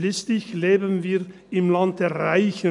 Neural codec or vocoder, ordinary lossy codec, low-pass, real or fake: none; none; 10.8 kHz; real